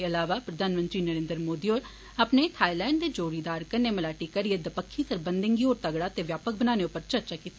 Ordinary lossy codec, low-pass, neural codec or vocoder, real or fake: none; none; none; real